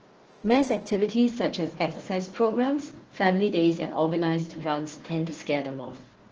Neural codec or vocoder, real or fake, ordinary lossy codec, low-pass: codec, 16 kHz, 1 kbps, FunCodec, trained on Chinese and English, 50 frames a second; fake; Opus, 16 kbps; 7.2 kHz